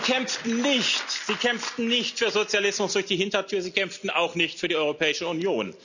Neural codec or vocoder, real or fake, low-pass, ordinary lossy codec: none; real; 7.2 kHz; none